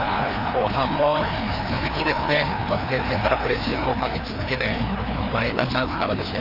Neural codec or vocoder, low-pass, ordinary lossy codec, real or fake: codec, 16 kHz, 2 kbps, FreqCodec, larger model; 5.4 kHz; none; fake